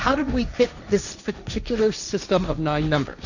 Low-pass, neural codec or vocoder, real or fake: 7.2 kHz; codec, 16 kHz, 1.1 kbps, Voila-Tokenizer; fake